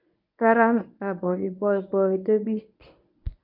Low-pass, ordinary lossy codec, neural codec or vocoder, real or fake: 5.4 kHz; none; codec, 24 kHz, 0.9 kbps, WavTokenizer, medium speech release version 1; fake